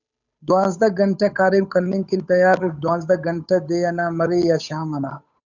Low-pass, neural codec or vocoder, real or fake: 7.2 kHz; codec, 16 kHz, 8 kbps, FunCodec, trained on Chinese and English, 25 frames a second; fake